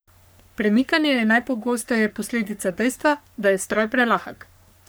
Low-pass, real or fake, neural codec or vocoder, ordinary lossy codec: none; fake; codec, 44.1 kHz, 3.4 kbps, Pupu-Codec; none